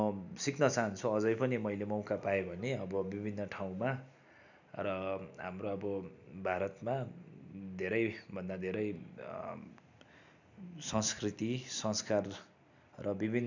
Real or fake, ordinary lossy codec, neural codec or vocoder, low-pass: real; none; none; 7.2 kHz